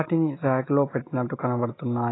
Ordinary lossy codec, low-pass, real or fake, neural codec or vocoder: AAC, 16 kbps; 7.2 kHz; real; none